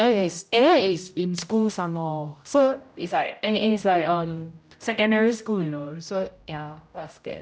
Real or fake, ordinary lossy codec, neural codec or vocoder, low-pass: fake; none; codec, 16 kHz, 0.5 kbps, X-Codec, HuBERT features, trained on general audio; none